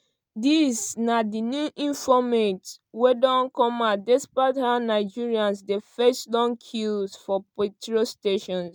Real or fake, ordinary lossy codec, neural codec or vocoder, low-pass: real; none; none; none